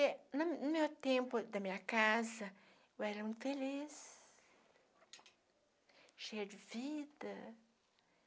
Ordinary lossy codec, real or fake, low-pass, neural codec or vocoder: none; real; none; none